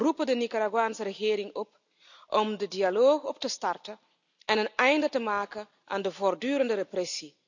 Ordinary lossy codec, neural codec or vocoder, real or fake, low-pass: none; none; real; 7.2 kHz